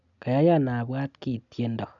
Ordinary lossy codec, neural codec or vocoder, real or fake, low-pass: none; none; real; 7.2 kHz